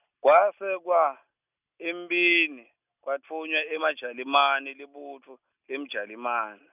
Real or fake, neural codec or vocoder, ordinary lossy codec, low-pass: real; none; none; 3.6 kHz